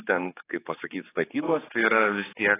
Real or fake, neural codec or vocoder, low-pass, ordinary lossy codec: fake; codec, 16 kHz, 4 kbps, X-Codec, HuBERT features, trained on general audio; 3.6 kHz; AAC, 16 kbps